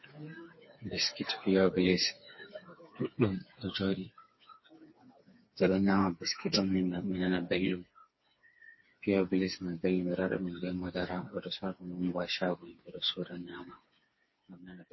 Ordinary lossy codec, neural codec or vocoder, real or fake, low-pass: MP3, 24 kbps; codec, 16 kHz, 4 kbps, FreqCodec, smaller model; fake; 7.2 kHz